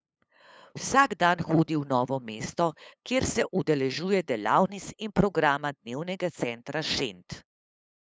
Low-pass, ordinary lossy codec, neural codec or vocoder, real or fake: none; none; codec, 16 kHz, 8 kbps, FunCodec, trained on LibriTTS, 25 frames a second; fake